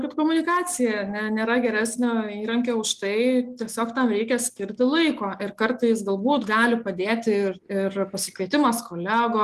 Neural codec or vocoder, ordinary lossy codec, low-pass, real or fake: none; Opus, 24 kbps; 14.4 kHz; real